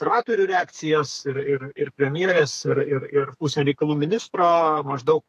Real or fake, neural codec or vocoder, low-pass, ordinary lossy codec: fake; codec, 32 kHz, 1.9 kbps, SNAC; 14.4 kHz; AAC, 64 kbps